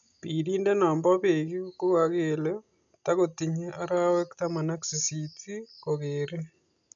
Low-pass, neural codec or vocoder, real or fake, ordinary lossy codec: 7.2 kHz; none; real; none